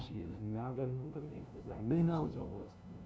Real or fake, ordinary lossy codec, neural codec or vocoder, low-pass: fake; none; codec, 16 kHz, 0.5 kbps, FunCodec, trained on LibriTTS, 25 frames a second; none